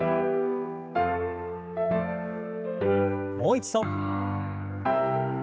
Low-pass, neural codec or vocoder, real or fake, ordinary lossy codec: none; codec, 16 kHz, 4 kbps, X-Codec, HuBERT features, trained on general audio; fake; none